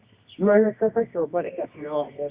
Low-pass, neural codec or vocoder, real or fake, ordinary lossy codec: 3.6 kHz; codec, 24 kHz, 0.9 kbps, WavTokenizer, medium music audio release; fake; Opus, 64 kbps